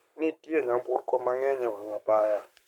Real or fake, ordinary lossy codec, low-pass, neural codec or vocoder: fake; MP3, 96 kbps; 19.8 kHz; codec, 44.1 kHz, 7.8 kbps, Pupu-Codec